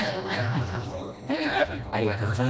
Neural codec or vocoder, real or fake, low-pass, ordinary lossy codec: codec, 16 kHz, 1 kbps, FreqCodec, smaller model; fake; none; none